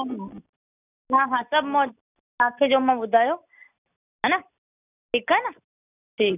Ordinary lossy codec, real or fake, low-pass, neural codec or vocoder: none; real; 3.6 kHz; none